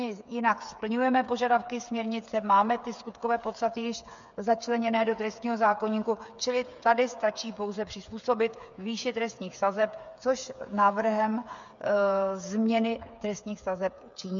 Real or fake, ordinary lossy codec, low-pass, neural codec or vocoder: fake; MP3, 64 kbps; 7.2 kHz; codec, 16 kHz, 8 kbps, FreqCodec, smaller model